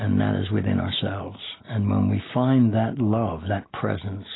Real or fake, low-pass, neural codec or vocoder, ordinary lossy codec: real; 7.2 kHz; none; AAC, 16 kbps